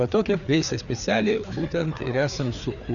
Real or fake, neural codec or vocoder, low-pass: fake; codec, 16 kHz, 4 kbps, FreqCodec, larger model; 7.2 kHz